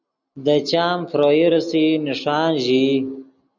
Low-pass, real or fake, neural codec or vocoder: 7.2 kHz; real; none